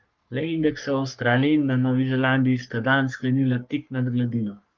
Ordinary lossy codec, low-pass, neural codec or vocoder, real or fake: Opus, 24 kbps; 7.2 kHz; codec, 44.1 kHz, 3.4 kbps, Pupu-Codec; fake